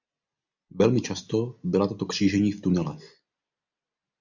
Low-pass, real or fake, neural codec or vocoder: 7.2 kHz; real; none